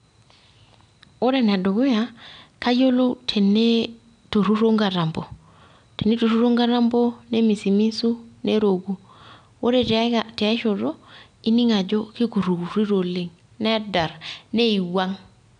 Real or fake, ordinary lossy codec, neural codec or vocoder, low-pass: real; none; none; 9.9 kHz